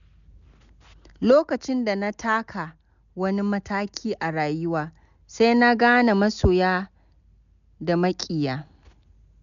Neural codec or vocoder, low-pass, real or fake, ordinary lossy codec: none; 7.2 kHz; real; none